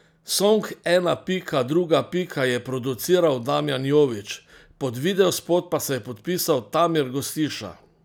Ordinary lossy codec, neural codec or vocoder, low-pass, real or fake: none; none; none; real